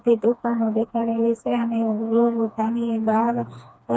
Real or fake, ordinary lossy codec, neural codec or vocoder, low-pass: fake; none; codec, 16 kHz, 2 kbps, FreqCodec, smaller model; none